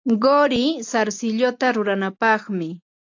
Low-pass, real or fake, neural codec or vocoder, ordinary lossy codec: 7.2 kHz; real; none; AAC, 48 kbps